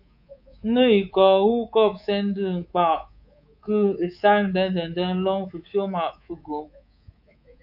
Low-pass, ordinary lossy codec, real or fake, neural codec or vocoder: 5.4 kHz; AAC, 48 kbps; fake; codec, 24 kHz, 3.1 kbps, DualCodec